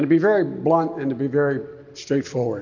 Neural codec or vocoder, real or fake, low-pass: none; real; 7.2 kHz